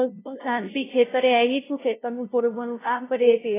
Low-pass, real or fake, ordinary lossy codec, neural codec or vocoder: 3.6 kHz; fake; AAC, 16 kbps; codec, 16 kHz, 0.5 kbps, FunCodec, trained on LibriTTS, 25 frames a second